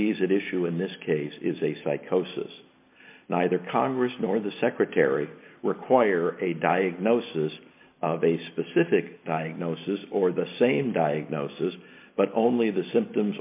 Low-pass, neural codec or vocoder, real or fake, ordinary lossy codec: 3.6 kHz; none; real; AAC, 32 kbps